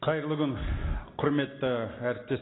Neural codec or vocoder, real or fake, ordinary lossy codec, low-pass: none; real; AAC, 16 kbps; 7.2 kHz